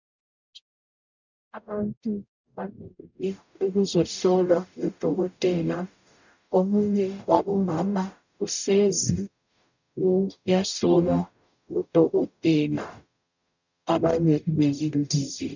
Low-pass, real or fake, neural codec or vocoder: 7.2 kHz; fake; codec, 44.1 kHz, 0.9 kbps, DAC